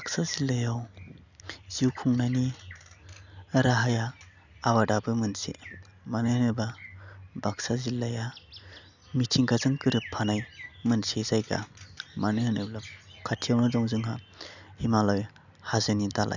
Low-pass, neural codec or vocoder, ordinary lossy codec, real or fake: 7.2 kHz; none; none; real